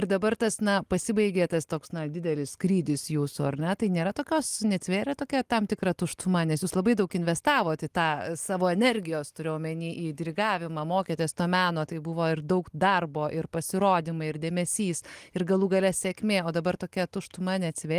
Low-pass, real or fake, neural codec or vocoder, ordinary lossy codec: 14.4 kHz; real; none; Opus, 24 kbps